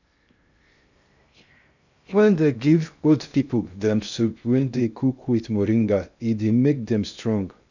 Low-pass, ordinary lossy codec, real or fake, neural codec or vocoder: 7.2 kHz; none; fake; codec, 16 kHz in and 24 kHz out, 0.6 kbps, FocalCodec, streaming, 2048 codes